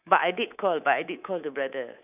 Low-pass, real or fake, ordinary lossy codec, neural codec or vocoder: 3.6 kHz; fake; none; codec, 24 kHz, 3.1 kbps, DualCodec